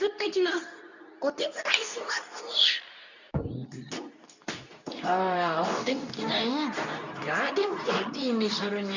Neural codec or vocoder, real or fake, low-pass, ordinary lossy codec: codec, 24 kHz, 0.9 kbps, WavTokenizer, medium speech release version 2; fake; 7.2 kHz; none